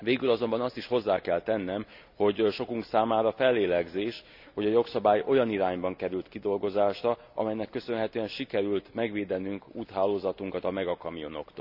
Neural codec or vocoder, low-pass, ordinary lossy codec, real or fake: none; 5.4 kHz; none; real